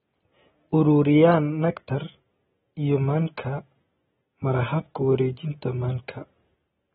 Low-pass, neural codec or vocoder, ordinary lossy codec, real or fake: 19.8 kHz; none; AAC, 16 kbps; real